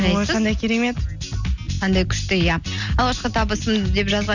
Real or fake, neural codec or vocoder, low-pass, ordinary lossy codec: real; none; 7.2 kHz; none